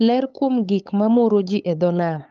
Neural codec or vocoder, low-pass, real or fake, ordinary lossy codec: codec, 16 kHz, 8 kbps, FunCodec, trained on LibriTTS, 25 frames a second; 7.2 kHz; fake; Opus, 24 kbps